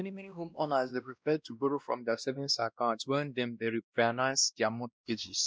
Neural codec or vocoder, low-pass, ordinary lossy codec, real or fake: codec, 16 kHz, 1 kbps, X-Codec, WavLM features, trained on Multilingual LibriSpeech; none; none; fake